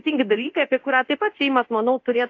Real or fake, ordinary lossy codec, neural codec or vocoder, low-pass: fake; AAC, 48 kbps; codec, 24 kHz, 0.9 kbps, DualCodec; 7.2 kHz